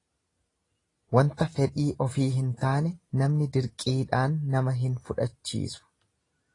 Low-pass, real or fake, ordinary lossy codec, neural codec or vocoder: 10.8 kHz; real; AAC, 32 kbps; none